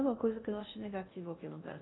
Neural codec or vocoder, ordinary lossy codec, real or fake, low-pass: codec, 16 kHz in and 24 kHz out, 0.6 kbps, FocalCodec, streaming, 2048 codes; AAC, 16 kbps; fake; 7.2 kHz